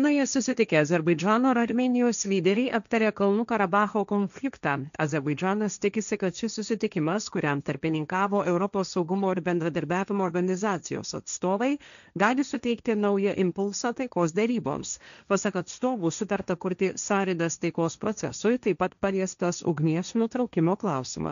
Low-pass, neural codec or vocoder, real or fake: 7.2 kHz; codec, 16 kHz, 1.1 kbps, Voila-Tokenizer; fake